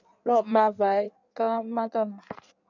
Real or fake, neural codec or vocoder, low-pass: fake; codec, 16 kHz in and 24 kHz out, 1.1 kbps, FireRedTTS-2 codec; 7.2 kHz